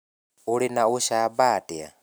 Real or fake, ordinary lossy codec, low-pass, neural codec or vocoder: real; none; none; none